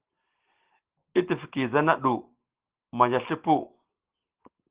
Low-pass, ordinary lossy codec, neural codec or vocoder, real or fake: 3.6 kHz; Opus, 16 kbps; none; real